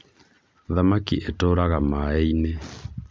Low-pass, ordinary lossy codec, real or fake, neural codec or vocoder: none; none; real; none